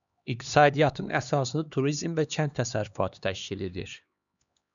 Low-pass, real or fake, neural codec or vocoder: 7.2 kHz; fake; codec, 16 kHz, 2 kbps, X-Codec, HuBERT features, trained on LibriSpeech